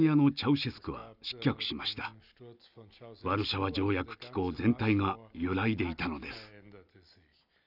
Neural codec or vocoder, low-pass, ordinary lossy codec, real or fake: none; 5.4 kHz; none; real